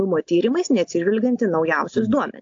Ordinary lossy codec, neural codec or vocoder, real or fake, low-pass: AAC, 48 kbps; none; real; 7.2 kHz